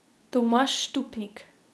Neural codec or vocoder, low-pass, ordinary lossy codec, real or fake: codec, 24 kHz, 0.9 kbps, WavTokenizer, medium speech release version 2; none; none; fake